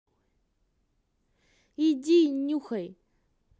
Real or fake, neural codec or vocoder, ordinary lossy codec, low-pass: real; none; none; none